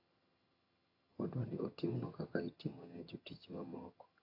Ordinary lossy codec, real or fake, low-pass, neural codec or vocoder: AAC, 48 kbps; fake; 5.4 kHz; vocoder, 22.05 kHz, 80 mel bands, HiFi-GAN